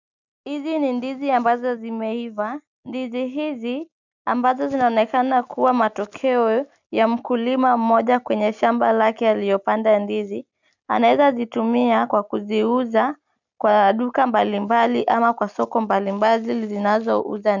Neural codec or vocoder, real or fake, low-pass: none; real; 7.2 kHz